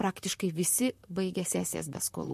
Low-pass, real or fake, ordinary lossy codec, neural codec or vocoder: 14.4 kHz; fake; MP3, 64 kbps; vocoder, 44.1 kHz, 128 mel bands, Pupu-Vocoder